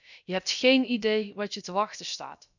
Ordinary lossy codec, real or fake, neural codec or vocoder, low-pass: none; fake; codec, 16 kHz, about 1 kbps, DyCAST, with the encoder's durations; 7.2 kHz